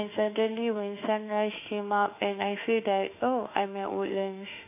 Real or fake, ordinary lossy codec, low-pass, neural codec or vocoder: fake; none; 3.6 kHz; autoencoder, 48 kHz, 32 numbers a frame, DAC-VAE, trained on Japanese speech